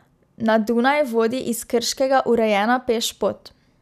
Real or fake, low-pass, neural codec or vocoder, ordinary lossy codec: real; 14.4 kHz; none; none